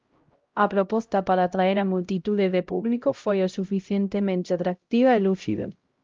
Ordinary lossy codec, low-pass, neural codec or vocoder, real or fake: Opus, 32 kbps; 7.2 kHz; codec, 16 kHz, 0.5 kbps, X-Codec, HuBERT features, trained on LibriSpeech; fake